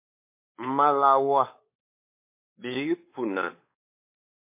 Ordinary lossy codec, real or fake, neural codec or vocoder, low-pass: MP3, 24 kbps; fake; codec, 16 kHz, 4 kbps, X-Codec, WavLM features, trained on Multilingual LibriSpeech; 3.6 kHz